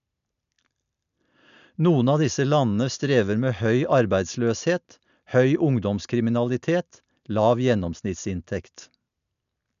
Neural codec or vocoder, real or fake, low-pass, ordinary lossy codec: none; real; 7.2 kHz; none